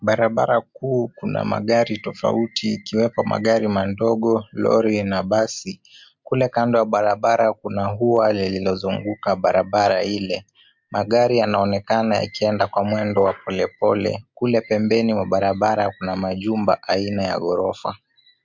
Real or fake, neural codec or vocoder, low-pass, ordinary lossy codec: real; none; 7.2 kHz; MP3, 64 kbps